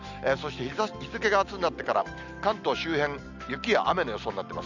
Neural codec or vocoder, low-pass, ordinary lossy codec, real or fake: none; 7.2 kHz; none; real